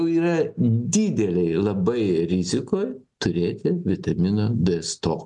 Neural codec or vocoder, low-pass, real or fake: none; 10.8 kHz; real